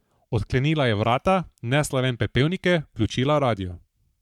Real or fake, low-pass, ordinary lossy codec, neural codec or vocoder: fake; 19.8 kHz; MP3, 96 kbps; codec, 44.1 kHz, 7.8 kbps, Pupu-Codec